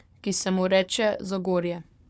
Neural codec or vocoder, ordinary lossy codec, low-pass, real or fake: codec, 16 kHz, 4 kbps, FunCodec, trained on Chinese and English, 50 frames a second; none; none; fake